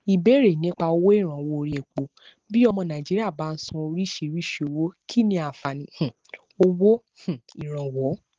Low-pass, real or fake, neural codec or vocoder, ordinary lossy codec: 7.2 kHz; real; none; Opus, 16 kbps